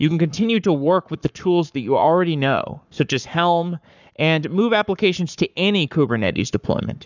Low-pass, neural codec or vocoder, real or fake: 7.2 kHz; codec, 44.1 kHz, 7.8 kbps, Pupu-Codec; fake